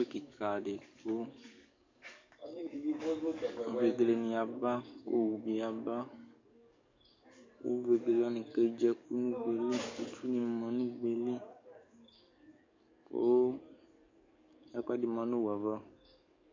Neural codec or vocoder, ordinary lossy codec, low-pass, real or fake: codec, 44.1 kHz, 7.8 kbps, DAC; MP3, 64 kbps; 7.2 kHz; fake